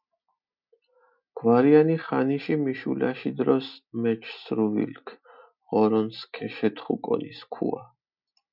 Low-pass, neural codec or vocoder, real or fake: 5.4 kHz; none; real